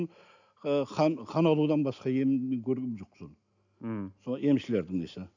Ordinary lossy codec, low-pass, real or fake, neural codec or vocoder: none; 7.2 kHz; real; none